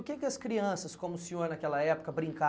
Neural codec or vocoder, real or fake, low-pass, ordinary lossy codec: none; real; none; none